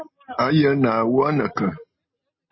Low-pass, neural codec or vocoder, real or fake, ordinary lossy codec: 7.2 kHz; none; real; MP3, 24 kbps